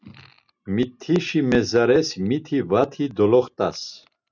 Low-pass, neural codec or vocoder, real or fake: 7.2 kHz; none; real